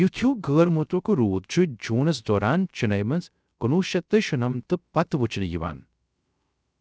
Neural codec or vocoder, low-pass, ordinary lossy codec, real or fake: codec, 16 kHz, 0.3 kbps, FocalCodec; none; none; fake